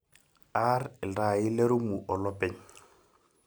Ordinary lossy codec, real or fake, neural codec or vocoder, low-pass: none; real; none; none